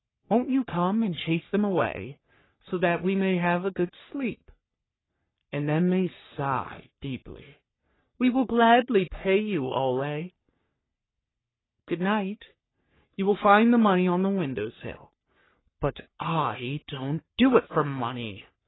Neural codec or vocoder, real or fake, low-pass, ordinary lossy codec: codec, 44.1 kHz, 3.4 kbps, Pupu-Codec; fake; 7.2 kHz; AAC, 16 kbps